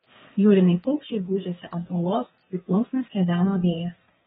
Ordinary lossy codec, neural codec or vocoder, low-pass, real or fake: AAC, 16 kbps; codec, 32 kHz, 1.9 kbps, SNAC; 14.4 kHz; fake